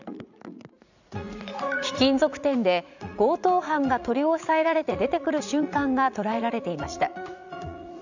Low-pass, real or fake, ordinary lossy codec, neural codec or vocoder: 7.2 kHz; fake; none; vocoder, 44.1 kHz, 80 mel bands, Vocos